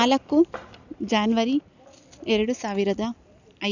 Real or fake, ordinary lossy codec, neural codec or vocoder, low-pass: real; none; none; 7.2 kHz